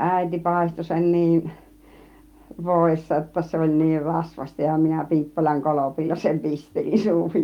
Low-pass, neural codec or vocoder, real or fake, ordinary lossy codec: 19.8 kHz; none; real; Opus, 32 kbps